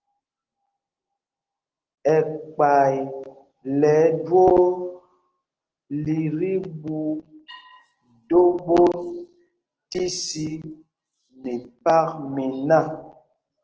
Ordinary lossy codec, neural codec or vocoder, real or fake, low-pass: Opus, 16 kbps; none; real; 7.2 kHz